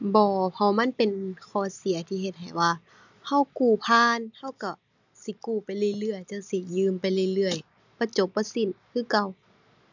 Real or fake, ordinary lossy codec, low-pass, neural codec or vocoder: real; none; 7.2 kHz; none